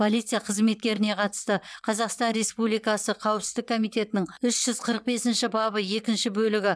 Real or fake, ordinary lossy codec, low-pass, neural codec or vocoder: fake; none; none; vocoder, 22.05 kHz, 80 mel bands, WaveNeXt